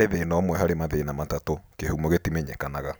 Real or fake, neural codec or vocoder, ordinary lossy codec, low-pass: real; none; none; none